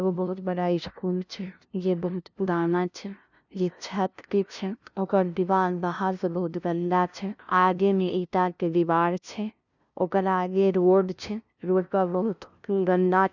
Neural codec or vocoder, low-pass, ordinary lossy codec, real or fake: codec, 16 kHz, 0.5 kbps, FunCodec, trained on LibriTTS, 25 frames a second; 7.2 kHz; none; fake